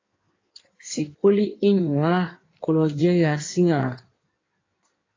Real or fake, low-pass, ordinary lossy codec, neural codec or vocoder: fake; 7.2 kHz; AAC, 32 kbps; codec, 16 kHz in and 24 kHz out, 1.1 kbps, FireRedTTS-2 codec